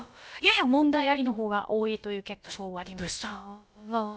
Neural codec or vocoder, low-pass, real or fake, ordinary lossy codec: codec, 16 kHz, about 1 kbps, DyCAST, with the encoder's durations; none; fake; none